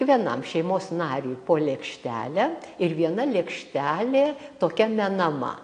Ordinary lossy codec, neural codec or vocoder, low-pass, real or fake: AAC, 48 kbps; none; 9.9 kHz; real